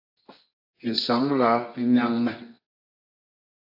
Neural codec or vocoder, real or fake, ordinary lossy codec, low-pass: codec, 24 kHz, 0.9 kbps, WavTokenizer, medium music audio release; fake; AAC, 48 kbps; 5.4 kHz